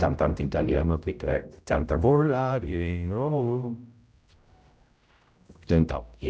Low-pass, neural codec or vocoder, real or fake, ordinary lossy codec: none; codec, 16 kHz, 0.5 kbps, X-Codec, HuBERT features, trained on general audio; fake; none